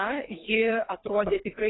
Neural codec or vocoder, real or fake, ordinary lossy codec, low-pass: codec, 24 kHz, 3 kbps, HILCodec; fake; AAC, 16 kbps; 7.2 kHz